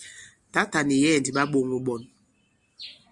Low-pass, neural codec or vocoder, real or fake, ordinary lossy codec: 10.8 kHz; none; real; Opus, 64 kbps